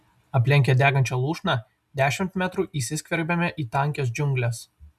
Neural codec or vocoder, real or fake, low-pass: none; real; 14.4 kHz